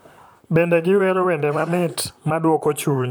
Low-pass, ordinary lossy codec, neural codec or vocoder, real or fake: none; none; vocoder, 44.1 kHz, 128 mel bands, Pupu-Vocoder; fake